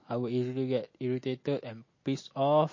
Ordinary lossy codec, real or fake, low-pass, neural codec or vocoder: MP3, 32 kbps; real; 7.2 kHz; none